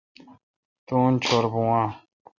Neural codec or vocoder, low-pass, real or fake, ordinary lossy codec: none; 7.2 kHz; real; Opus, 64 kbps